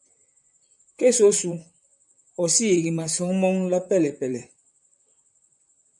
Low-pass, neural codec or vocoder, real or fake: 10.8 kHz; vocoder, 44.1 kHz, 128 mel bands, Pupu-Vocoder; fake